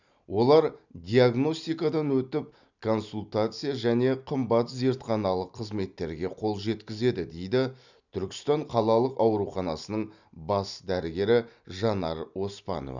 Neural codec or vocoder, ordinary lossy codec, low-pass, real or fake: none; none; 7.2 kHz; real